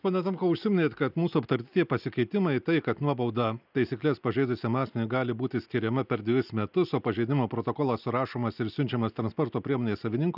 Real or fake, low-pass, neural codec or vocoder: real; 5.4 kHz; none